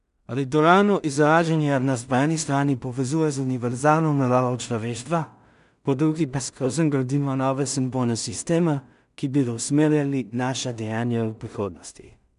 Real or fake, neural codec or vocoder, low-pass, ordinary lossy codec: fake; codec, 16 kHz in and 24 kHz out, 0.4 kbps, LongCat-Audio-Codec, two codebook decoder; 10.8 kHz; none